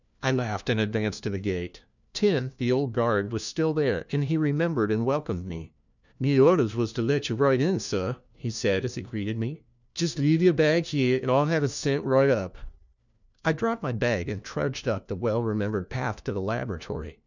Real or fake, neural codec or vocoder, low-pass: fake; codec, 16 kHz, 1 kbps, FunCodec, trained on LibriTTS, 50 frames a second; 7.2 kHz